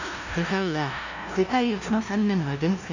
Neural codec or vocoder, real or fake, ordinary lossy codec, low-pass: codec, 16 kHz, 0.5 kbps, FunCodec, trained on LibriTTS, 25 frames a second; fake; none; 7.2 kHz